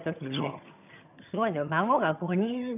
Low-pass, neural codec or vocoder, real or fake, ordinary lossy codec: 3.6 kHz; vocoder, 22.05 kHz, 80 mel bands, HiFi-GAN; fake; none